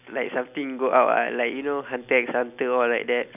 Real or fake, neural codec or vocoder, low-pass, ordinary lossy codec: real; none; 3.6 kHz; none